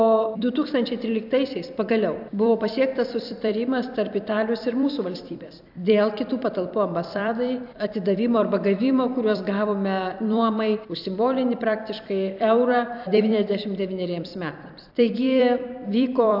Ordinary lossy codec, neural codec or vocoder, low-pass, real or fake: AAC, 48 kbps; vocoder, 44.1 kHz, 128 mel bands every 256 samples, BigVGAN v2; 5.4 kHz; fake